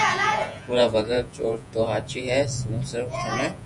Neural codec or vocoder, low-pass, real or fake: vocoder, 48 kHz, 128 mel bands, Vocos; 10.8 kHz; fake